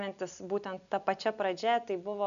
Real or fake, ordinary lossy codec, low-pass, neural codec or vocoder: real; MP3, 96 kbps; 7.2 kHz; none